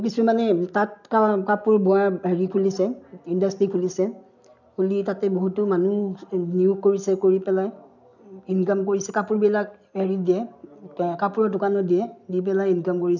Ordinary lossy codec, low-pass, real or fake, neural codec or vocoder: none; 7.2 kHz; real; none